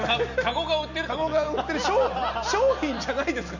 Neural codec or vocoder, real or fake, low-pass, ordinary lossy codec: none; real; 7.2 kHz; none